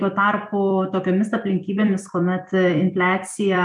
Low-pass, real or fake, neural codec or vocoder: 10.8 kHz; fake; vocoder, 44.1 kHz, 128 mel bands every 256 samples, BigVGAN v2